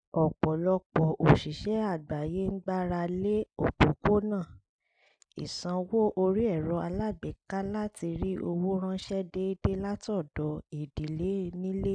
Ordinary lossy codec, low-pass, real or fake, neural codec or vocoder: none; 9.9 kHz; real; none